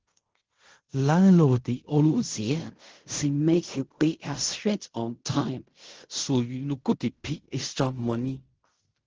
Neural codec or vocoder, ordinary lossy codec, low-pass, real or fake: codec, 16 kHz in and 24 kHz out, 0.4 kbps, LongCat-Audio-Codec, fine tuned four codebook decoder; Opus, 16 kbps; 7.2 kHz; fake